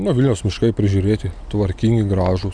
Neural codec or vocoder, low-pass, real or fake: none; 9.9 kHz; real